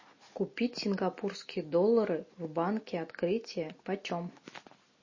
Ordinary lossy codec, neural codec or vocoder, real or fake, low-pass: MP3, 32 kbps; none; real; 7.2 kHz